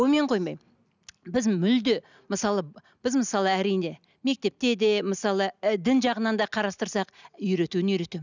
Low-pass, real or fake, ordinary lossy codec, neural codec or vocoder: 7.2 kHz; real; none; none